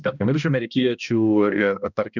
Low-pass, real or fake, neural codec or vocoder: 7.2 kHz; fake; codec, 16 kHz, 1 kbps, X-Codec, HuBERT features, trained on balanced general audio